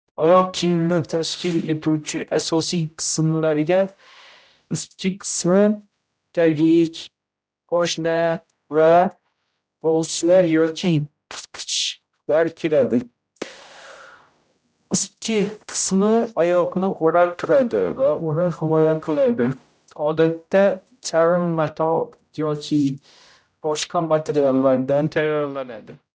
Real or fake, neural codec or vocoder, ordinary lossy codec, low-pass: fake; codec, 16 kHz, 0.5 kbps, X-Codec, HuBERT features, trained on general audio; none; none